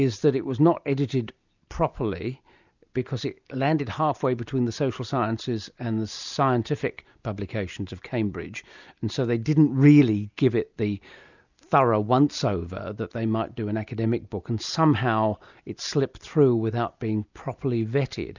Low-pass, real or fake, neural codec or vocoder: 7.2 kHz; real; none